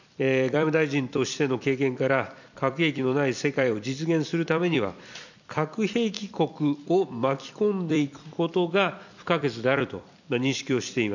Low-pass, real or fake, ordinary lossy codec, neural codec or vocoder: 7.2 kHz; fake; none; vocoder, 44.1 kHz, 128 mel bands every 256 samples, BigVGAN v2